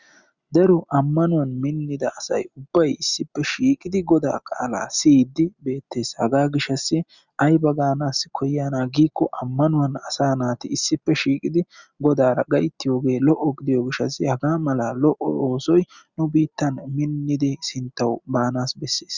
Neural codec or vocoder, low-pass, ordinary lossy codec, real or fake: none; 7.2 kHz; Opus, 64 kbps; real